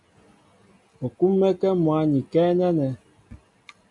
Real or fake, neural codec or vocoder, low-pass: real; none; 10.8 kHz